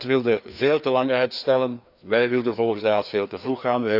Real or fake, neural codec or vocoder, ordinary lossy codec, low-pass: fake; codec, 16 kHz, 2 kbps, FreqCodec, larger model; none; 5.4 kHz